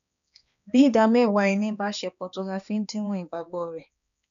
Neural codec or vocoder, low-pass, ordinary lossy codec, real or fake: codec, 16 kHz, 2 kbps, X-Codec, HuBERT features, trained on balanced general audio; 7.2 kHz; none; fake